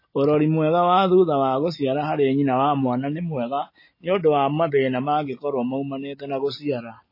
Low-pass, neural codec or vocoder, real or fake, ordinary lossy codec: 5.4 kHz; none; real; MP3, 24 kbps